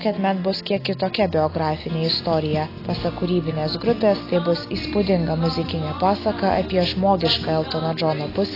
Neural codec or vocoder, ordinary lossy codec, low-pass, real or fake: none; AAC, 24 kbps; 5.4 kHz; real